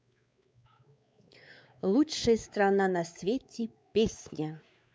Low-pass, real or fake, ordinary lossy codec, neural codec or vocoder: none; fake; none; codec, 16 kHz, 4 kbps, X-Codec, WavLM features, trained on Multilingual LibriSpeech